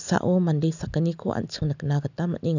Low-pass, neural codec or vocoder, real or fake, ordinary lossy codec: 7.2 kHz; none; real; none